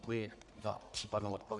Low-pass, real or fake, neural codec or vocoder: 10.8 kHz; fake; codec, 44.1 kHz, 1.7 kbps, Pupu-Codec